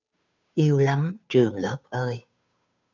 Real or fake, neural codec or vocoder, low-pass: fake; codec, 16 kHz, 2 kbps, FunCodec, trained on Chinese and English, 25 frames a second; 7.2 kHz